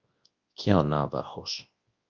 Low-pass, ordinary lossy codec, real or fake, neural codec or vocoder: 7.2 kHz; Opus, 24 kbps; fake; codec, 24 kHz, 0.9 kbps, WavTokenizer, large speech release